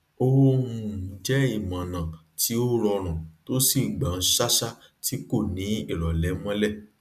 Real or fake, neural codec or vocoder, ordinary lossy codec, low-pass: real; none; none; 14.4 kHz